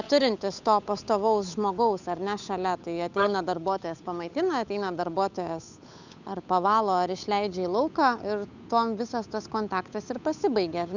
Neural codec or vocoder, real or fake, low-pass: codec, 16 kHz, 8 kbps, FunCodec, trained on Chinese and English, 25 frames a second; fake; 7.2 kHz